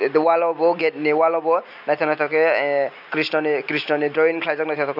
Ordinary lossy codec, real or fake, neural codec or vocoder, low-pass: none; real; none; 5.4 kHz